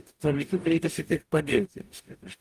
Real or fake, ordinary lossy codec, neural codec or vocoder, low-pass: fake; Opus, 32 kbps; codec, 44.1 kHz, 0.9 kbps, DAC; 14.4 kHz